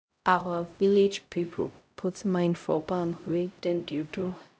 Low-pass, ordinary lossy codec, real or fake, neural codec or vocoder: none; none; fake; codec, 16 kHz, 0.5 kbps, X-Codec, HuBERT features, trained on LibriSpeech